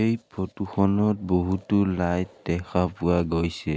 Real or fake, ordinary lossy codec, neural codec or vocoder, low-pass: real; none; none; none